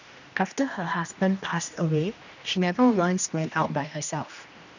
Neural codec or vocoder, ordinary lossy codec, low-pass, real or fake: codec, 16 kHz, 1 kbps, X-Codec, HuBERT features, trained on general audio; none; 7.2 kHz; fake